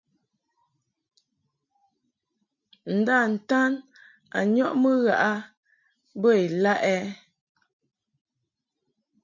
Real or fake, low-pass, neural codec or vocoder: real; 7.2 kHz; none